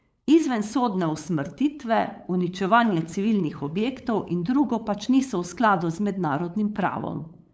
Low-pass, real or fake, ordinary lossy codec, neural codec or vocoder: none; fake; none; codec, 16 kHz, 8 kbps, FunCodec, trained on LibriTTS, 25 frames a second